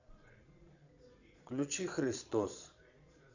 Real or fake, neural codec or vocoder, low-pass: fake; vocoder, 22.05 kHz, 80 mel bands, Vocos; 7.2 kHz